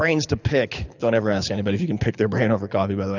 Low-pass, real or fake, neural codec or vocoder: 7.2 kHz; fake; codec, 24 kHz, 6 kbps, HILCodec